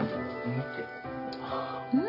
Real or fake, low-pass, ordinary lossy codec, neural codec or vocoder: real; 5.4 kHz; none; none